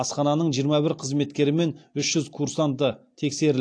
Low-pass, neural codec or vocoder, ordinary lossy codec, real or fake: 9.9 kHz; none; AAC, 48 kbps; real